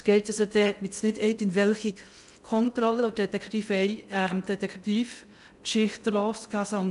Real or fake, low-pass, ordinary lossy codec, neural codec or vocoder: fake; 10.8 kHz; none; codec, 16 kHz in and 24 kHz out, 0.6 kbps, FocalCodec, streaming, 2048 codes